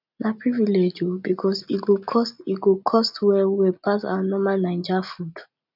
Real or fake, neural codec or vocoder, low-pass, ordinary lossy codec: real; none; 5.4 kHz; none